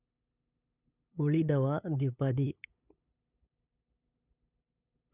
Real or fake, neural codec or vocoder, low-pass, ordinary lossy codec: fake; codec, 16 kHz, 8 kbps, FunCodec, trained on LibriTTS, 25 frames a second; 3.6 kHz; none